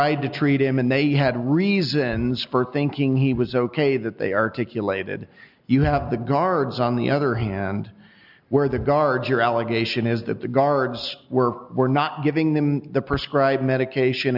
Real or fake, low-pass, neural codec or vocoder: real; 5.4 kHz; none